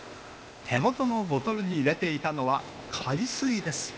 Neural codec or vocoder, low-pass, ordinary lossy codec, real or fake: codec, 16 kHz, 0.8 kbps, ZipCodec; none; none; fake